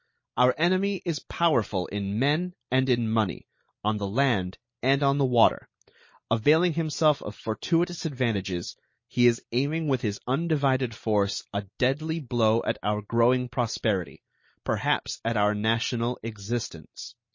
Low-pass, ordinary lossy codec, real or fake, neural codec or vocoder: 7.2 kHz; MP3, 32 kbps; real; none